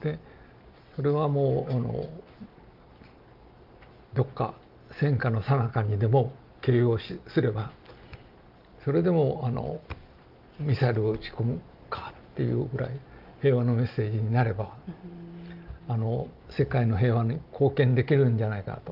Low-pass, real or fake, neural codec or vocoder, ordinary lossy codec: 5.4 kHz; real; none; Opus, 32 kbps